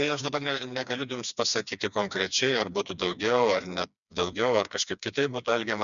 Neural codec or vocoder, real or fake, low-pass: codec, 16 kHz, 2 kbps, FreqCodec, smaller model; fake; 7.2 kHz